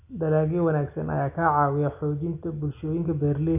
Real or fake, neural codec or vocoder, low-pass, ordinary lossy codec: real; none; 3.6 kHz; none